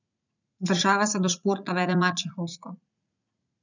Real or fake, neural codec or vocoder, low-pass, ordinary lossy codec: fake; vocoder, 44.1 kHz, 80 mel bands, Vocos; 7.2 kHz; none